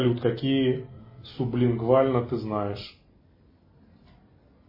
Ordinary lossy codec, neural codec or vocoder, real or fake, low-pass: MP3, 24 kbps; none; real; 5.4 kHz